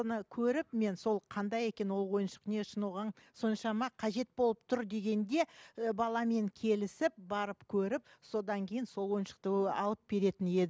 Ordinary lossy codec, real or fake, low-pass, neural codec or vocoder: none; real; none; none